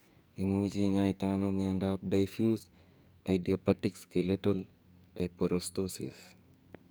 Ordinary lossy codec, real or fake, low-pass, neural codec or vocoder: none; fake; none; codec, 44.1 kHz, 2.6 kbps, SNAC